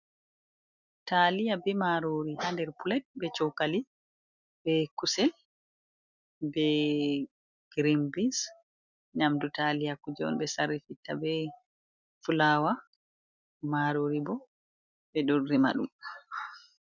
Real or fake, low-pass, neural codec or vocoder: real; 7.2 kHz; none